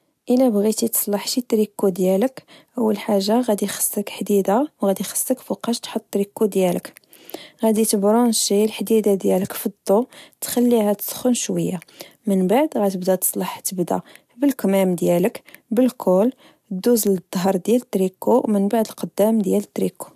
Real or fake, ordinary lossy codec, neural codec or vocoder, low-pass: real; AAC, 96 kbps; none; 14.4 kHz